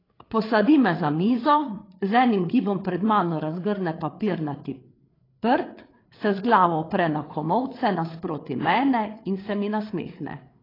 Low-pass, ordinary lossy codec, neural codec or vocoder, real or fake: 5.4 kHz; AAC, 24 kbps; codec, 16 kHz, 8 kbps, FreqCodec, larger model; fake